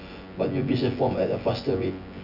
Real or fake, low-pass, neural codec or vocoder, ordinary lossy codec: fake; 5.4 kHz; vocoder, 24 kHz, 100 mel bands, Vocos; none